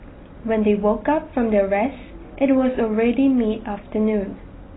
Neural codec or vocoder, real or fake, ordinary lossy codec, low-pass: none; real; AAC, 16 kbps; 7.2 kHz